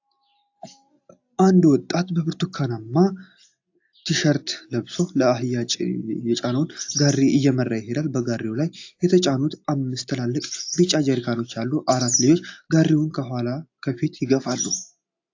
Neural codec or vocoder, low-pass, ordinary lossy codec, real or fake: none; 7.2 kHz; AAC, 48 kbps; real